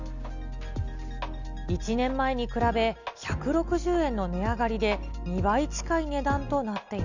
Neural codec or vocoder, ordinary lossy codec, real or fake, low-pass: none; none; real; 7.2 kHz